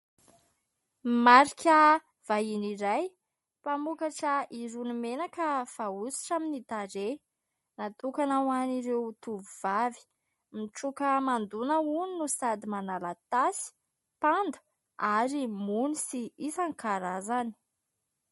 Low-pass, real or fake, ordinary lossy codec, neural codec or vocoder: 19.8 kHz; real; MP3, 48 kbps; none